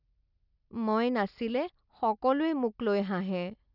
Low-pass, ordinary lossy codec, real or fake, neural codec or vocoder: 5.4 kHz; none; real; none